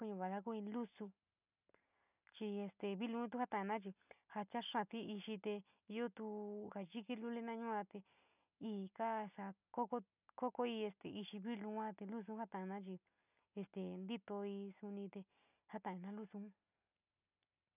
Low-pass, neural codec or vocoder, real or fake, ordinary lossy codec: 3.6 kHz; none; real; none